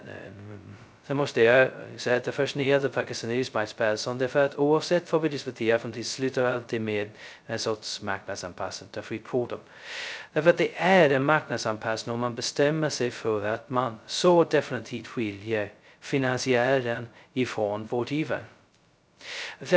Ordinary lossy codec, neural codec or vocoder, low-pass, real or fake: none; codec, 16 kHz, 0.2 kbps, FocalCodec; none; fake